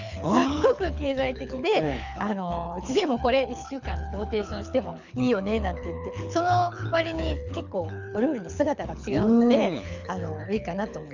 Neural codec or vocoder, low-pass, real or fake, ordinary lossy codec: codec, 24 kHz, 6 kbps, HILCodec; 7.2 kHz; fake; none